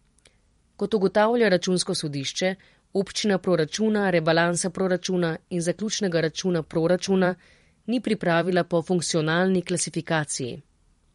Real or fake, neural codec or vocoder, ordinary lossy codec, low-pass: fake; vocoder, 44.1 kHz, 128 mel bands every 512 samples, BigVGAN v2; MP3, 48 kbps; 19.8 kHz